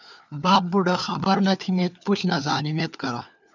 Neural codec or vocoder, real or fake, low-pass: codec, 16 kHz, 4 kbps, FunCodec, trained on LibriTTS, 50 frames a second; fake; 7.2 kHz